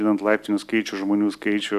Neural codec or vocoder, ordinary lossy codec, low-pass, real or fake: none; AAC, 96 kbps; 14.4 kHz; real